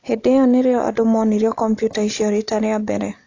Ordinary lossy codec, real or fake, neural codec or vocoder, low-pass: AAC, 48 kbps; real; none; 7.2 kHz